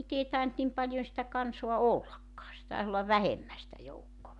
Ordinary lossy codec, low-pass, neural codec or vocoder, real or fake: none; 10.8 kHz; none; real